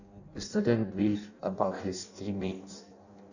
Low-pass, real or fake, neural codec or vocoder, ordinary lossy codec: 7.2 kHz; fake; codec, 16 kHz in and 24 kHz out, 0.6 kbps, FireRedTTS-2 codec; none